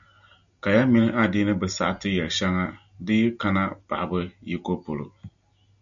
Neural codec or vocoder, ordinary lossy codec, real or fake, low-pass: none; MP3, 96 kbps; real; 7.2 kHz